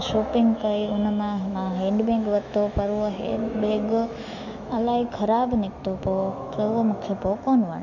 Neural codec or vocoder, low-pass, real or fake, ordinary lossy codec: autoencoder, 48 kHz, 128 numbers a frame, DAC-VAE, trained on Japanese speech; 7.2 kHz; fake; none